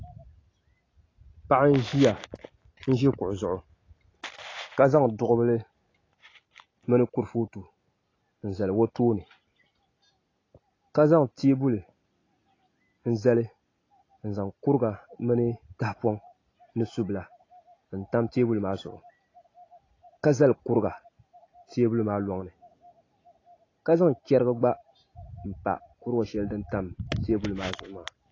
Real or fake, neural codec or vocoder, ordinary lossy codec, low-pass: real; none; AAC, 32 kbps; 7.2 kHz